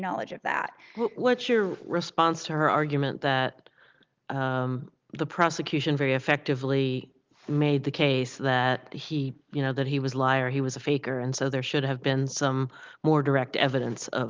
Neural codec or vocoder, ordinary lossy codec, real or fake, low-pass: none; Opus, 32 kbps; real; 7.2 kHz